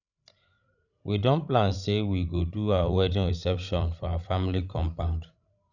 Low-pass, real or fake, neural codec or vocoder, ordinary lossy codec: 7.2 kHz; fake; codec, 16 kHz, 16 kbps, FreqCodec, larger model; none